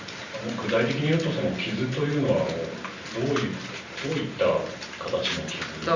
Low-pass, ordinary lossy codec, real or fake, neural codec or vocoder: 7.2 kHz; Opus, 64 kbps; real; none